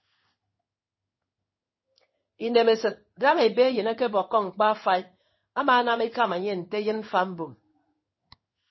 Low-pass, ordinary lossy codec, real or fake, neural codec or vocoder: 7.2 kHz; MP3, 24 kbps; fake; codec, 16 kHz in and 24 kHz out, 1 kbps, XY-Tokenizer